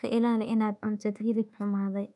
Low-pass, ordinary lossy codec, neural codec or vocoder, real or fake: 10.8 kHz; none; codec, 24 kHz, 1.2 kbps, DualCodec; fake